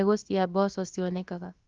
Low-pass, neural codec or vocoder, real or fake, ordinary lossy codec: 7.2 kHz; codec, 16 kHz, about 1 kbps, DyCAST, with the encoder's durations; fake; Opus, 32 kbps